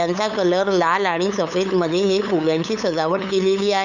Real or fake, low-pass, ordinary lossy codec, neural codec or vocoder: fake; 7.2 kHz; none; codec, 16 kHz, 8 kbps, FunCodec, trained on LibriTTS, 25 frames a second